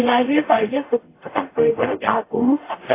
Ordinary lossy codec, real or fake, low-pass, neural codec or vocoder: AAC, 24 kbps; fake; 3.6 kHz; codec, 44.1 kHz, 0.9 kbps, DAC